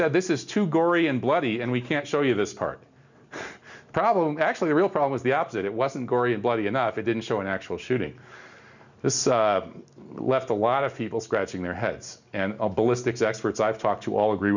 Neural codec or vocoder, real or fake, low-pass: none; real; 7.2 kHz